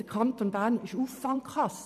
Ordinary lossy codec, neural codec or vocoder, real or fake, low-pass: none; vocoder, 44.1 kHz, 128 mel bands every 512 samples, BigVGAN v2; fake; 14.4 kHz